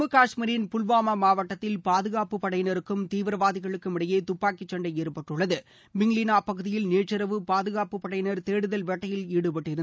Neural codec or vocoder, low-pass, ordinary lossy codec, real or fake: none; none; none; real